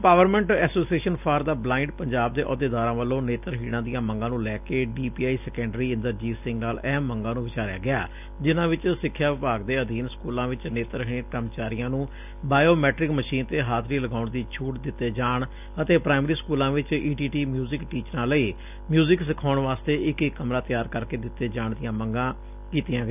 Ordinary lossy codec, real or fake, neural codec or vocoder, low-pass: none; real; none; 3.6 kHz